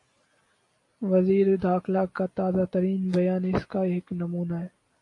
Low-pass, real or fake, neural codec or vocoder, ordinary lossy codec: 10.8 kHz; real; none; AAC, 48 kbps